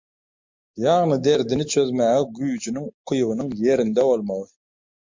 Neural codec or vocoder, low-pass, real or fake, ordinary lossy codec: none; 7.2 kHz; real; MP3, 48 kbps